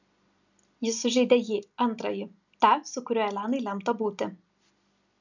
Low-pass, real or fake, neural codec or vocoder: 7.2 kHz; real; none